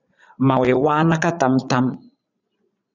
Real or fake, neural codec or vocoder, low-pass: fake; vocoder, 22.05 kHz, 80 mel bands, Vocos; 7.2 kHz